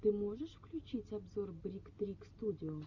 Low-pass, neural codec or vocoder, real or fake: 7.2 kHz; none; real